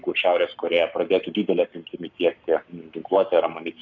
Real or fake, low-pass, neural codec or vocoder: fake; 7.2 kHz; codec, 44.1 kHz, 7.8 kbps, Pupu-Codec